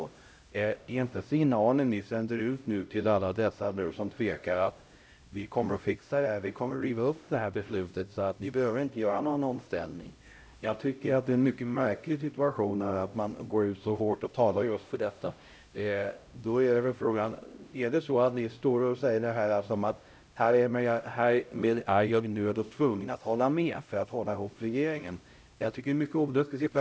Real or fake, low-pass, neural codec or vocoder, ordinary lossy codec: fake; none; codec, 16 kHz, 0.5 kbps, X-Codec, HuBERT features, trained on LibriSpeech; none